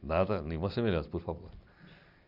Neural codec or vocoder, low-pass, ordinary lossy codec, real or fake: none; 5.4 kHz; none; real